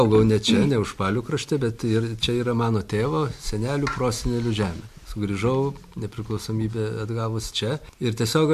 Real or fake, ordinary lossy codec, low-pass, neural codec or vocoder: real; MP3, 64 kbps; 14.4 kHz; none